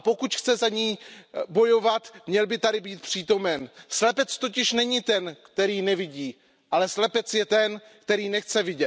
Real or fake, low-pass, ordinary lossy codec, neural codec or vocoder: real; none; none; none